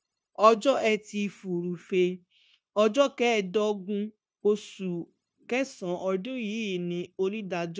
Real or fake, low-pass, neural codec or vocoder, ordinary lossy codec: fake; none; codec, 16 kHz, 0.9 kbps, LongCat-Audio-Codec; none